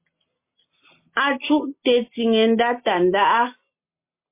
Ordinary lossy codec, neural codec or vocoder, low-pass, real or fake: MP3, 24 kbps; none; 3.6 kHz; real